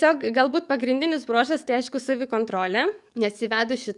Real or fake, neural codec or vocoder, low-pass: fake; codec, 44.1 kHz, 7.8 kbps, DAC; 10.8 kHz